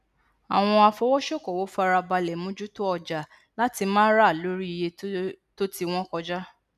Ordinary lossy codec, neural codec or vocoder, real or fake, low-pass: none; none; real; 14.4 kHz